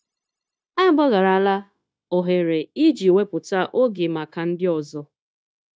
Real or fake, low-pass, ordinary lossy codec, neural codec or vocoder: fake; none; none; codec, 16 kHz, 0.9 kbps, LongCat-Audio-Codec